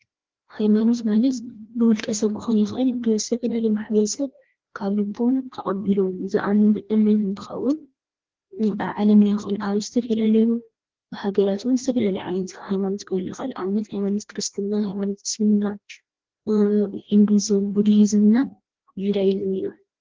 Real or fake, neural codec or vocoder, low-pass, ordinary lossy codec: fake; codec, 16 kHz, 1 kbps, FreqCodec, larger model; 7.2 kHz; Opus, 16 kbps